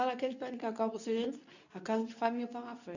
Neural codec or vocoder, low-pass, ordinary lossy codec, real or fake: codec, 24 kHz, 0.9 kbps, WavTokenizer, medium speech release version 2; 7.2 kHz; none; fake